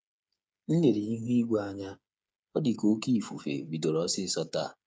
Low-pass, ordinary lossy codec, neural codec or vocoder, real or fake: none; none; codec, 16 kHz, 8 kbps, FreqCodec, smaller model; fake